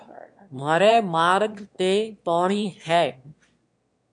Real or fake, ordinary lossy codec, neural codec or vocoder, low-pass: fake; MP3, 64 kbps; autoencoder, 22.05 kHz, a latent of 192 numbers a frame, VITS, trained on one speaker; 9.9 kHz